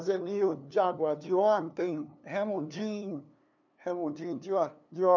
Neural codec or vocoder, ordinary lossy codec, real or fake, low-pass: codec, 16 kHz, 2 kbps, FunCodec, trained on LibriTTS, 25 frames a second; none; fake; 7.2 kHz